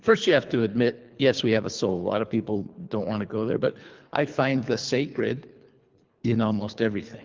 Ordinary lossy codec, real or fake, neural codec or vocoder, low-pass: Opus, 32 kbps; fake; codec, 24 kHz, 3 kbps, HILCodec; 7.2 kHz